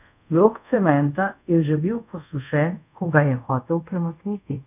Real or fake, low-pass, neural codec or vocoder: fake; 3.6 kHz; codec, 24 kHz, 0.5 kbps, DualCodec